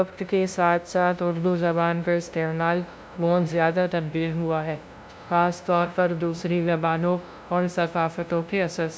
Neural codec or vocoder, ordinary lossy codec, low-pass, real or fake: codec, 16 kHz, 0.5 kbps, FunCodec, trained on LibriTTS, 25 frames a second; none; none; fake